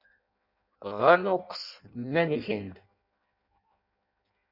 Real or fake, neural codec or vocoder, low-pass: fake; codec, 16 kHz in and 24 kHz out, 0.6 kbps, FireRedTTS-2 codec; 5.4 kHz